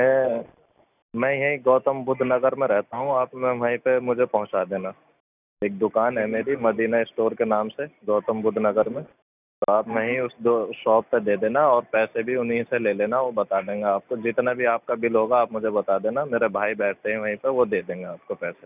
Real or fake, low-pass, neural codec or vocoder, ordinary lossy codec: real; 3.6 kHz; none; none